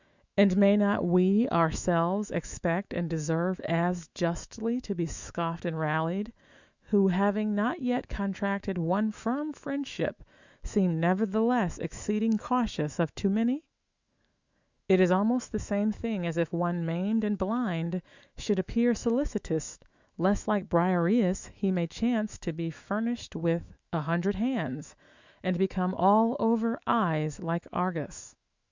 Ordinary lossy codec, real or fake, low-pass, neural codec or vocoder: Opus, 64 kbps; fake; 7.2 kHz; autoencoder, 48 kHz, 128 numbers a frame, DAC-VAE, trained on Japanese speech